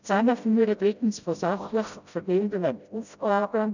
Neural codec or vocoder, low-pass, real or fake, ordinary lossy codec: codec, 16 kHz, 0.5 kbps, FreqCodec, smaller model; 7.2 kHz; fake; none